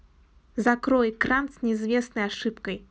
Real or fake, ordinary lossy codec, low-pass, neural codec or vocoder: real; none; none; none